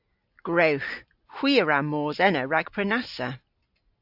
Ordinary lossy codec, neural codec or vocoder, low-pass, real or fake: AAC, 48 kbps; none; 5.4 kHz; real